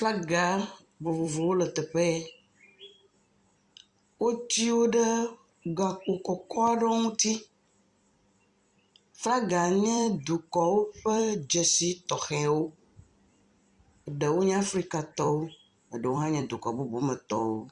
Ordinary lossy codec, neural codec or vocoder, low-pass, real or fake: Opus, 64 kbps; vocoder, 44.1 kHz, 128 mel bands every 256 samples, BigVGAN v2; 10.8 kHz; fake